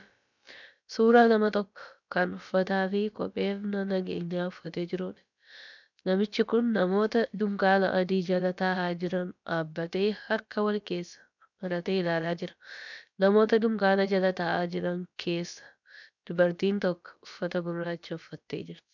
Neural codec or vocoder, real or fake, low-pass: codec, 16 kHz, about 1 kbps, DyCAST, with the encoder's durations; fake; 7.2 kHz